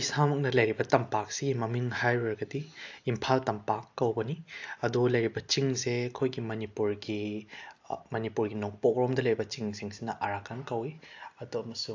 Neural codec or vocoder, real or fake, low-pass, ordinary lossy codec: none; real; 7.2 kHz; AAC, 48 kbps